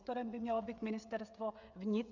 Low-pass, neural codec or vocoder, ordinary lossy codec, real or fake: 7.2 kHz; codec, 16 kHz, 16 kbps, FreqCodec, smaller model; Opus, 64 kbps; fake